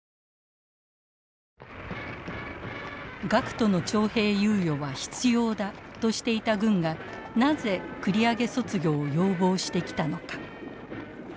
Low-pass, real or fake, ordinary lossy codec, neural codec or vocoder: none; real; none; none